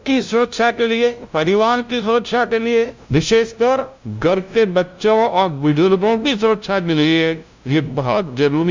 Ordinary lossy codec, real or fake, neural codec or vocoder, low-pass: MP3, 64 kbps; fake; codec, 16 kHz, 0.5 kbps, FunCodec, trained on LibriTTS, 25 frames a second; 7.2 kHz